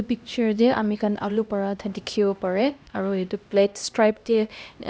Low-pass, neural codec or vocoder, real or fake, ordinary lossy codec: none; codec, 16 kHz, 1 kbps, X-Codec, HuBERT features, trained on LibriSpeech; fake; none